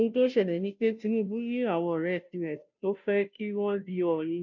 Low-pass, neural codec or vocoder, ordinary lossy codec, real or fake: 7.2 kHz; codec, 16 kHz, 0.5 kbps, FunCodec, trained on Chinese and English, 25 frames a second; none; fake